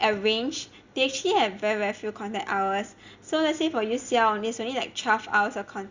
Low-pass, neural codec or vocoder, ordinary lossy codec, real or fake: 7.2 kHz; none; Opus, 64 kbps; real